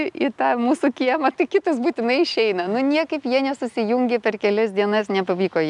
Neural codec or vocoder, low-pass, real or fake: none; 10.8 kHz; real